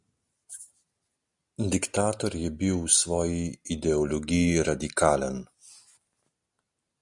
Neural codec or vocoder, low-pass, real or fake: none; 10.8 kHz; real